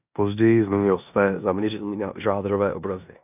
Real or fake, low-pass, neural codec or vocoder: fake; 3.6 kHz; codec, 16 kHz in and 24 kHz out, 0.9 kbps, LongCat-Audio-Codec, four codebook decoder